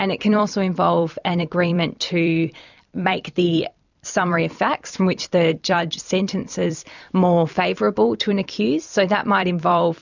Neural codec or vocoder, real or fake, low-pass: vocoder, 44.1 kHz, 128 mel bands every 256 samples, BigVGAN v2; fake; 7.2 kHz